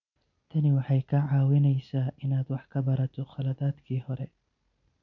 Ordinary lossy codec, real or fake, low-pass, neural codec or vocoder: none; real; 7.2 kHz; none